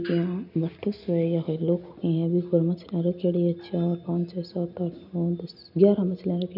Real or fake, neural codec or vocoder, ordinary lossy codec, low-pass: real; none; none; 5.4 kHz